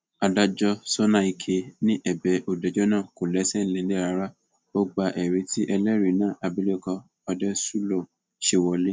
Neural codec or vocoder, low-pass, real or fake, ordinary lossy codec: none; none; real; none